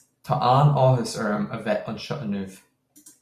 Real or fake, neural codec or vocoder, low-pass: real; none; 14.4 kHz